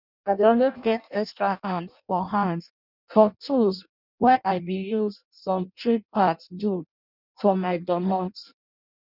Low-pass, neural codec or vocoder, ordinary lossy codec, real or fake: 5.4 kHz; codec, 16 kHz in and 24 kHz out, 0.6 kbps, FireRedTTS-2 codec; none; fake